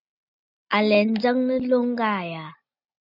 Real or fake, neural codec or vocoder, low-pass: real; none; 5.4 kHz